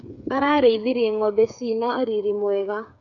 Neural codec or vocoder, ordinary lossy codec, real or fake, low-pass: codec, 16 kHz, 8 kbps, FreqCodec, smaller model; MP3, 96 kbps; fake; 7.2 kHz